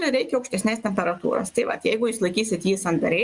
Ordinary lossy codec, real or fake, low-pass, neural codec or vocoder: Opus, 64 kbps; real; 10.8 kHz; none